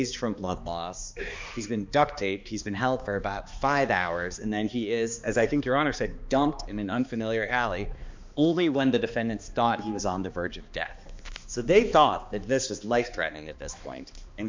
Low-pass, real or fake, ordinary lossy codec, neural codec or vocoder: 7.2 kHz; fake; MP3, 64 kbps; codec, 16 kHz, 2 kbps, X-Codec, HuBERT features, trained on balanced general audio